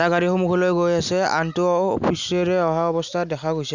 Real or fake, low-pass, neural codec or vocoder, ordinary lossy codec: real; 7.2 kHz; none; none